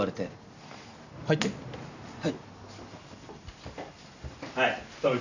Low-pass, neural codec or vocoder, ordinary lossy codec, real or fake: 7.2 kHz; none; none; real